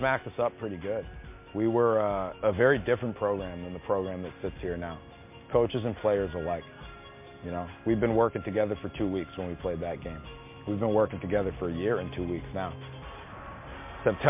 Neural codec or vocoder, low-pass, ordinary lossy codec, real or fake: none; 3.6 kHz; MP3, 24 kbps; real